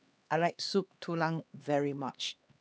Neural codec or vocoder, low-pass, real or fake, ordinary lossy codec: codec, 16 kHz, 2 kbps, X-Codec, HuBERT features, trained on LibriSpeech; none; fake; none